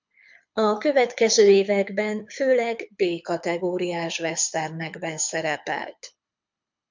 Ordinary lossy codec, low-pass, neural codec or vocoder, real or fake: MP3, 64 kbps; 7.2 kHz; codec, 24 kHz, 6 kbps, HILCodec; fake